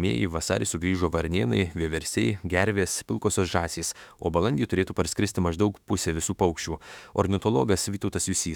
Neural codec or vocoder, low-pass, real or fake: autoencoder, 48 kHz, 32 numbers a frame, DAC-VAE, trained on Japanese speech; 19.8 kHz; fake